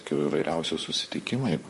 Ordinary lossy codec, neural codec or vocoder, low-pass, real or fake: MP3, 48 kbps; vocoder, 44.1 kHz, 128 mel bands, Pupu-Vocoder; 14.4 kHz; fake